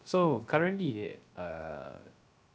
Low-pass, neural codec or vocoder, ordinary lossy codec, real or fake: none; codec, 16 kHz, 0.3 kbps, FocalCodec; none; fake